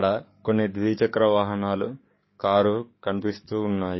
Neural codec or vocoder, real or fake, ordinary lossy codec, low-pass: codec, 44.1 kHz, 7.8 kbps, DAC; fake; MP3, 24 kbps; 7.2 kHz